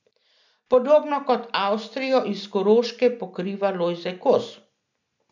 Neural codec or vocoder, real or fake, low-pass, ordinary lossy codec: none; real; 7.2 kHz; none